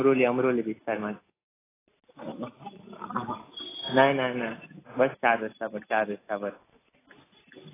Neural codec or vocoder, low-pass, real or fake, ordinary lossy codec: none; 3.6 kHz; real; AAC, 16 kbps